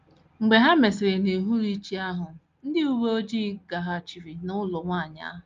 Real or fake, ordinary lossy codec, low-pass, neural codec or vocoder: real; Opus, 32 kbps; 7.2 kHz; none